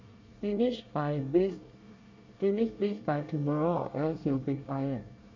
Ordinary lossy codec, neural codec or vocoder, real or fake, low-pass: MP3, 64 kbps; codec, 24 kHz, 1 kbps, SNAC; fake; 7.2 kHz